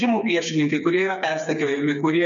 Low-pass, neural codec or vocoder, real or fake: 7.2 kHz; codec, 16 kHz, 4 kbps, FreqCodec, smaller model; fake